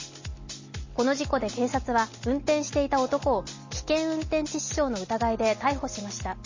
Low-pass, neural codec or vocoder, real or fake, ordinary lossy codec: 7.2 kHz; none; real; MP3, 32 kbps